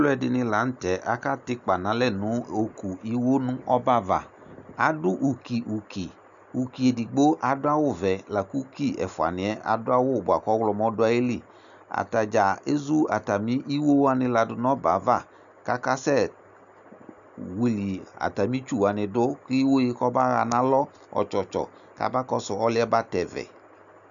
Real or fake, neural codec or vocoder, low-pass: real; none; 7.2 kHz